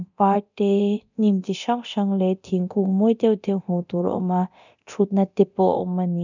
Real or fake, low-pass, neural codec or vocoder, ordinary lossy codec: fake; 7.2 kHz; codec, 24 kHz, 0.9 kbps, DualCodec; none